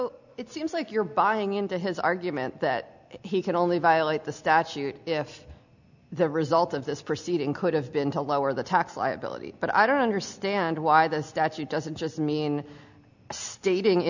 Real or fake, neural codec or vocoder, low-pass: real; none; 7.2 kHz